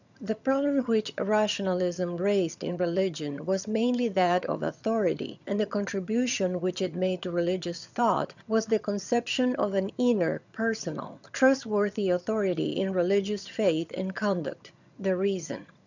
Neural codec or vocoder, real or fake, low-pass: vocoder, 22.05 kHz, 80 mel bands, HiFi-GAN; fake; 7.2 kHz